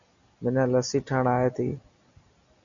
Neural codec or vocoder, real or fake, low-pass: none; real; 7.2 kHz